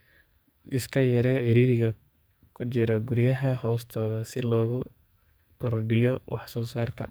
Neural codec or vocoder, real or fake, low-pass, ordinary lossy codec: codec, 44.1 kHz, 2.6 kbps, SNAC; fake; none; none